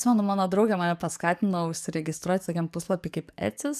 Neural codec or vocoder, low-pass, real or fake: codec, 44.1 kHz, 7.8 kbps, DAC; 14.4 kHz; fake